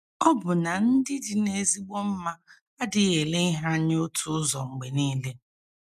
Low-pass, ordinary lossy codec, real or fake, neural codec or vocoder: 14.4 kHz; none; real; none